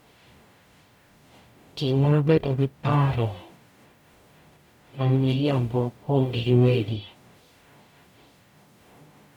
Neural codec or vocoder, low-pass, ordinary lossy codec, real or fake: codec, 44.1 kHz, 0.9 kbps, DAC; 19.8 kHz; none; fake